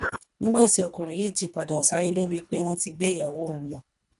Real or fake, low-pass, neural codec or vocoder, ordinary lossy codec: fake; 10.8 kHz; codec, 24 kHz, 1.5 kbps, HILCodec; none